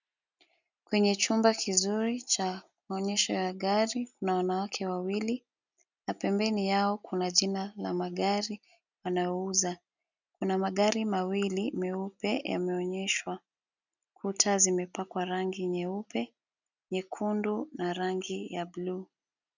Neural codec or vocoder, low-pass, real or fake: none; 7.2 kHz; real